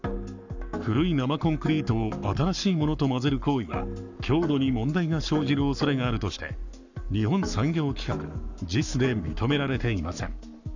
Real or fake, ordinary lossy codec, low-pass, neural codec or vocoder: fake; none; 7.2 kHz; codec, 44.1 kHz, 7.8 kbps, Pupu-Codec